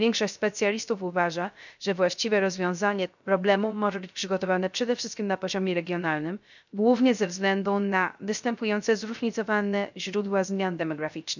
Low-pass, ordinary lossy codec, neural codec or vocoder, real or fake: 7.2 kHz; none; codec, 16 kHz, 0.3 kbps, FocalCodec; fake